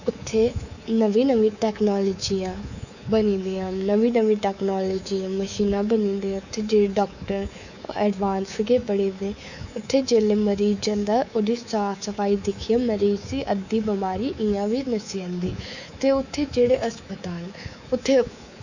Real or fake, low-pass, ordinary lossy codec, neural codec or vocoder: fake; 7.2 kHz; none; codec, 24 kHz, 3.1 kbps, DualCodec